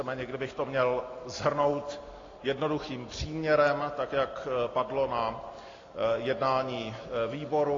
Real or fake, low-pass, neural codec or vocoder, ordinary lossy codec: real; 7.2 kHz; none; AAC, 32 kbps